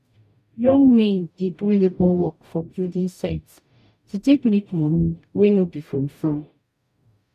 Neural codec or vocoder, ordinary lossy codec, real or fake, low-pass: codec, 44.1 kHz, 0.9 kbps, DAC; none; fake; 14.4 kHz